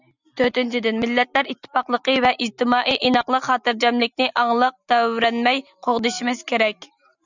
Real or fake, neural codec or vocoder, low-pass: real; none; 7.2 kHz